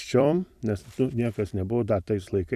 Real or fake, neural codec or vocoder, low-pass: fake; vocoder, 44.1 kHz, 128 mel bands every 256 samples, BigVGAN v2; 14.4 kHz